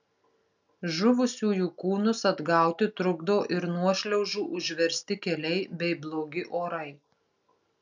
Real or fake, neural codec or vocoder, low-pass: real; none; 7.2 kHz